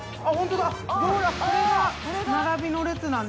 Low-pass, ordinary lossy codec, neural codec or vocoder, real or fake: none; none; none; real